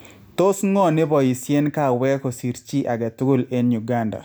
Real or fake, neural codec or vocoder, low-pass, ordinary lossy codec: real; none; none; none